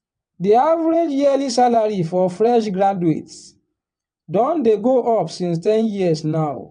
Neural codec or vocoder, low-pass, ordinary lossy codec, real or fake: vocoder, 22.05 kHz, 80 mel bands, WaveNeXt; 9.9 kHz; none; fake